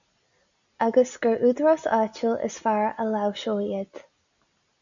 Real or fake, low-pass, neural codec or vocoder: real; 7.2 kHz; none